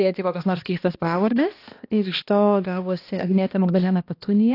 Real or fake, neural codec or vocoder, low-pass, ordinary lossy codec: fake; codec, 16 kHz, 1 kbps, X-Codec, HuBERT features, trained on balanced general audio; 5.4 kHz; AAC, 32 kbps